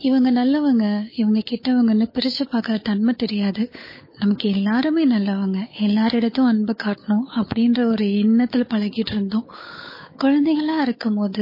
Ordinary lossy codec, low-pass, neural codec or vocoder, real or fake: MP3, 24 kbps; 5.4 kHz; codec, 16 kHz, 8 kbps, FunCodec, trained on Chinese and English, 25 frames a second; fake